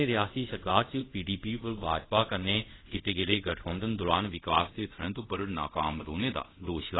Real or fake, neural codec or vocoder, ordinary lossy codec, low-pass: fake; codec, 24 kHz, 0.5 kbps, DualCodec; AAC, 16 kbps; 7.2 kHz